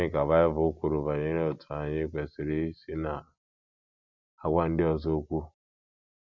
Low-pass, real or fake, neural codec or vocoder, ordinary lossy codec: 7.2 kHz; real; none; none